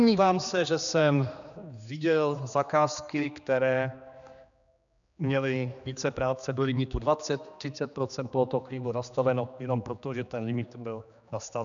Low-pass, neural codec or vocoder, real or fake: 7.2 kHz; codec, 16 kHz, 2 kbps, X-Codec, HuBERT features, trained on general audio; fake